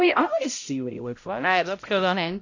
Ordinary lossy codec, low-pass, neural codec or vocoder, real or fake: AAC, 48 kbps; 7.2 kHz; codec, 16 kHz, 0.5 kbps, X-Codec, HuBERT features, trained on balanced general audio; fake